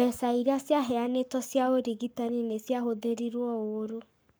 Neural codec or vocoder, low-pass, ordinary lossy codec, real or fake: codec, 44.1 kHz, 7.8 kbps, Pupu-Codec; none; none; fake